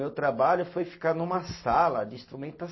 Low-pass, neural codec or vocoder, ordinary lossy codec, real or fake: 7.2 kHz; none; MP3, 24 kbps; real